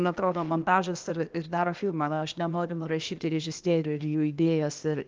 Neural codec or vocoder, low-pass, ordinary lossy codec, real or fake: codec, 16 kHz, 0.8 kbps, ZipCodec; 7.2 kHz; Opus, 32 kbps; fake